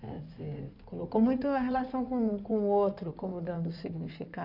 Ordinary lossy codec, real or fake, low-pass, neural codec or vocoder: none; fake; 5.4 kHz; codec, 16 kHz in and 24 kHz out, 2.2 kbps, FireRedTTS-2 codec